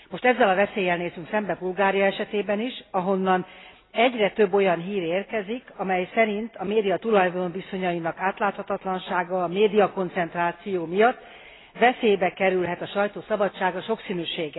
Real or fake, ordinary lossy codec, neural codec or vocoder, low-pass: real; AAC, 16 kbps; none; 7.2 kHz